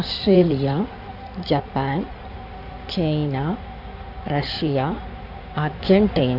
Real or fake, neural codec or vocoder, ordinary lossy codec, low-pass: fake; codec, 16 kHz in and 24 kHz out, 2.2 kbps, FireRedTTS-2 codec; none; 5.4 kHz